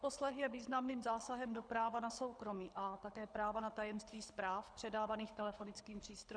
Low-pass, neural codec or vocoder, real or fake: 9.9 kHz; codec, 24 kHz, 6 kbps, HILCodec; fake